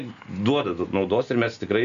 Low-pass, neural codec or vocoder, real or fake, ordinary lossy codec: 7.2 kHz; none; real; AAC, 64 kbps